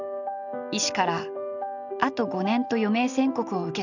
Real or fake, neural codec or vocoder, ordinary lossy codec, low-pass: real; none; none; 7.2 kHz